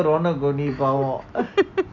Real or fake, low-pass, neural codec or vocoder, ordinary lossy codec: real; 7.2 kHz; none; none